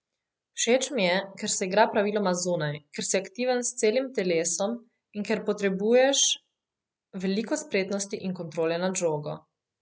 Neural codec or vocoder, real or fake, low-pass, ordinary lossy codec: none; real; none; none